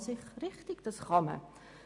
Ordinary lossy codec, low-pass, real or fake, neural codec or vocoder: AAC, 64 kbps; 10.8 kHz; real; none